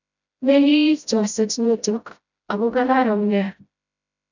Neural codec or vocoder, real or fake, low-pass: codec, 16 kHz, 0.5 kbps, FreqCodec, smaller model; fake; 7.2 kHz